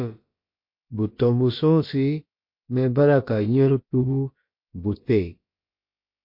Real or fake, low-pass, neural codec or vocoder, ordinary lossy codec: fake; 5.4 kHz; codec, 16 kHz, about 1 kbps, DyCAST, with the encoder's durations; MP3, 32 kbps